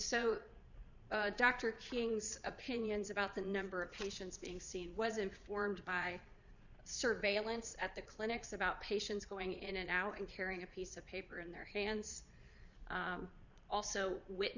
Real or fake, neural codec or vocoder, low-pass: fake; vocoder, 22.05 kHz, 80 mel bands, Vocos; 7.2 kHz